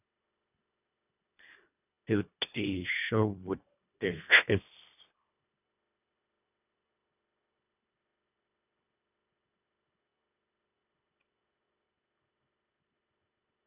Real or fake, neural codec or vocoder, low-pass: fake; codec, 24 kHz, 1.5 kbps, HILCodec; 3.6 kHz